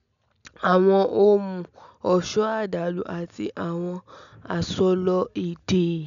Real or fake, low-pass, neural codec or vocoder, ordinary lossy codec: real; 7.2 kHz; none; none